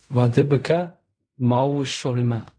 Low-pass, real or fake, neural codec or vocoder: 9.9 kHz; fake; codec, 16 kHz in and 24 kHz out, 0.4 kbps, LongCat-Audio-Codec, fine tuned four codebook decoder